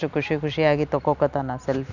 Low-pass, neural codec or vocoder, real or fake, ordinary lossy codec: 7.2 kHz; none; real; none